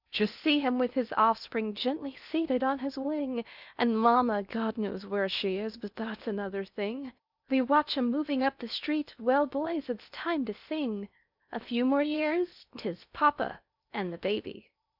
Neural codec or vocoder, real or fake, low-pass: codec, 16 kHz in and 24 kHz out, 0.8 kbps, FocalCodec, streaming, 65536 codes; fake; 5.4 kHz